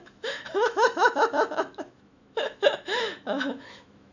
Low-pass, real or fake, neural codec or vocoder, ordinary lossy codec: 7.2 kHz; fake; autoencoder, 48 kHz, 128 numbers a frame, DAC-VAE, trained on Japanese speech; none